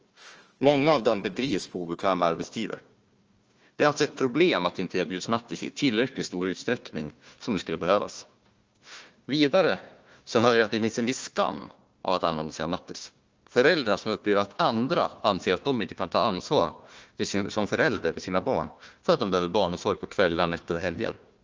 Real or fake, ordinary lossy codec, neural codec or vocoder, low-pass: fake; Opus, 24 kbps; codec, 16 kHz, 1 kbps, FunCodec, trained on Chinese and English, 50 frames a second; 7.2 kHz